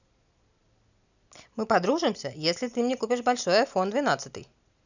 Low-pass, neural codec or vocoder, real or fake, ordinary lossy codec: 7.2 kHz; none; real; none